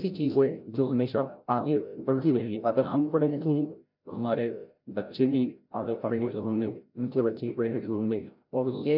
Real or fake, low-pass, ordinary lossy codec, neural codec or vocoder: fake; 5.4 kHz; none; codec, 16 kHz, 0.5 kbps, FreqCodec, larger model